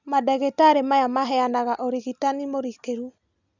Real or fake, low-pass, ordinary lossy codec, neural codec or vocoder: real; 7.2 kHz; none; none